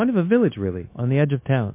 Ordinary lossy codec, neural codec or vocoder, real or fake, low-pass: MP3, 24 kbps; codec, 16 kHz, 0.9 kbps, LongCat-Audio-Codec; fake; 3.6 kHz